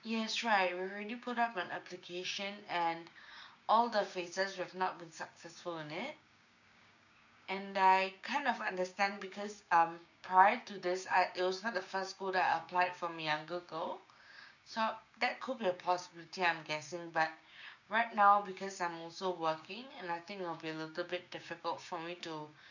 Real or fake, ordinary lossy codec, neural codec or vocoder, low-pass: fake; none; codec, 16 kHz, 6 kbps, DAC; 7.2 kHz